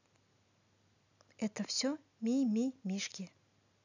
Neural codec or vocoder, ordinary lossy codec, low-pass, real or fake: none; none; 7.2 kHz; real